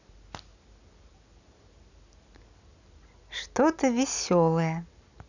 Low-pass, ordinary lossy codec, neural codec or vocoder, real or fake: 7.2 kHz; none; none; real